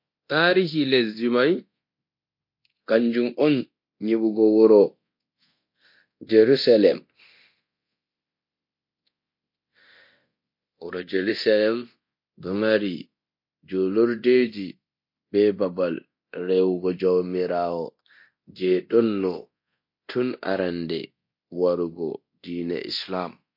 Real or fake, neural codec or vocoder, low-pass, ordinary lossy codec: fake; codec, 24 kHz, 0.9 kbps, DualCodec; 5.4 kHz; MP3, 32 kbps